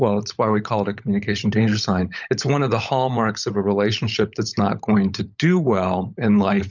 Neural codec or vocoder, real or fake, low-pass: codec, 16 kHz, 16 kbps, FunCodec, trained on LibriTTS, 50 frames a second; fake; 7.2 kHz